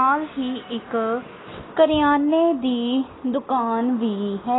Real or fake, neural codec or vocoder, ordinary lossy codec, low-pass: real; none; AAC, 16 kbps; 7.2 kHz